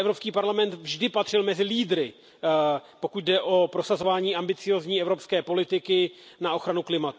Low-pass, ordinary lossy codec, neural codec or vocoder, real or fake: none; none; none; real